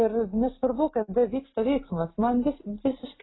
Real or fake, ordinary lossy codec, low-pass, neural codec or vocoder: real; AAC, 16 kbps; 7.2 kHz; none